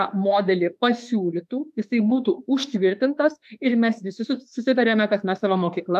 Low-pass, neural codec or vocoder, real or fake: 14.4 kHz; autoencoder, 48 kHz, 32 numbers a frame, DAC-VAE, trained on Japanese speech; fake